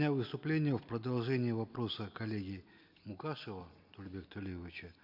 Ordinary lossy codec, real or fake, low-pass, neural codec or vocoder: none; real; 5.4 kHz; none